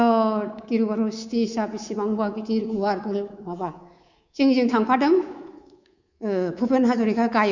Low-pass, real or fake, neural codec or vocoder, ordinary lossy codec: 7.2 kHz; fake; codec, 24 kHz, 3.1 kbps, DualCodec; Opus, 64 kbps